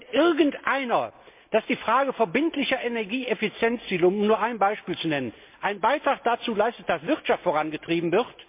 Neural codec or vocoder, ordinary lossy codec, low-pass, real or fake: none; MP3, 32 kbps; 3.6 kHz; real